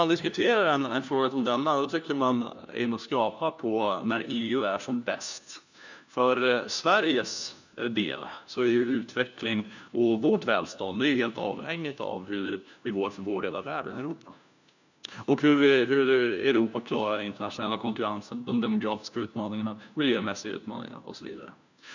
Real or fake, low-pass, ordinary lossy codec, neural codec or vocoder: fake; 7.2 kHz; none; codec, 16 kHz, 1 kbps, FunCodec, trained on LibriTTS, 50 frames a second